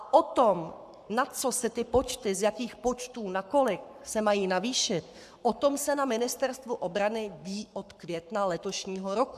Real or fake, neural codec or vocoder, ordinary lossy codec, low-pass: fake; codec, 44.1 kHz, 7.8 kbps, Pupu-Codec; AAC, 96 kbps; 14.4 kHz